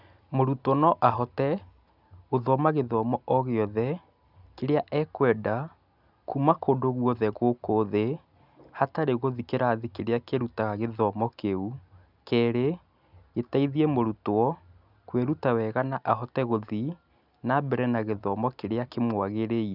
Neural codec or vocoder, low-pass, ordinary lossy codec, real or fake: none; 5.4 kHz; none; real